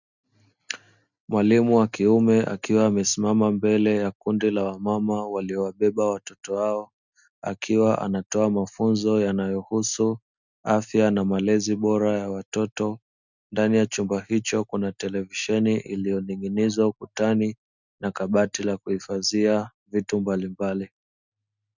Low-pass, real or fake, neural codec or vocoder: 7.2 kHz; real; none